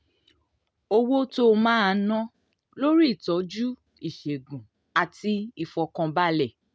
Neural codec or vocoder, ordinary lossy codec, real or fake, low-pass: none; none; real; none